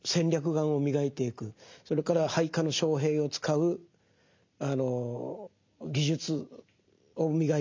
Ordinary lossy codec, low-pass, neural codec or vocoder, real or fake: MP3, 64 kbps; 7.2 kHz; none; real